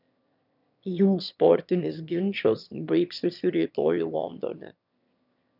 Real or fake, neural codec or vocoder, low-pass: fake; autoencoder, 22.05 kHz, a latent of 192 numbers a frame, VITS, trained on one speaker; 5.4 kHz